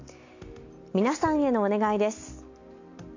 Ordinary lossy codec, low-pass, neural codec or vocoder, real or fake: AAC, 48 kbps; 7.2 kHz; none; real